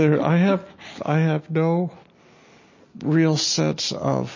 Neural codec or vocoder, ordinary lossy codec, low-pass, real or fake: none; MP3, 32 kbps; 7.2 kHz; real